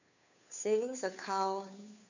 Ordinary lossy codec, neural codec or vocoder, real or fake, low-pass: none; codec, 16 kHz, 2 kbps, FunCodec, trained on Chinese and English, 25 frames a second; fake; 7.2 kHz